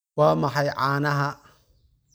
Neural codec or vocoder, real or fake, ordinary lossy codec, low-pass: none; real; none; none